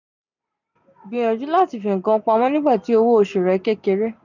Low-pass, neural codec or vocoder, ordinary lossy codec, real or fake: 7.2 kHz; none; none; real